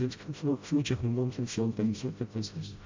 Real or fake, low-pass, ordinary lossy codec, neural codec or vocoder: fake; 7.2 kHz; MP3, 48 kbps; codec, 16 kHz, 0.5 kbps, FreqCodec, smaller model